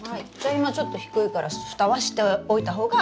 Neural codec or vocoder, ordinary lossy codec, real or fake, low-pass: none; none; real; none